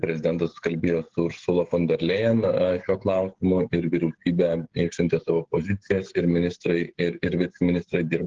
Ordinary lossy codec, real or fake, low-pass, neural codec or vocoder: Opus, 16 kbps; fake; 7.2 kHz; codec, 16 kHz, 16 kbps, FreqCodec, smaller model